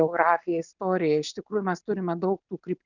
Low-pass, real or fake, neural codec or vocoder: 7.2 kHz; real; none